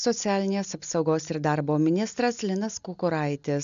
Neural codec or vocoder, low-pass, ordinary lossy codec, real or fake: none; 7.2 kHz; AAC, 96 kbps; real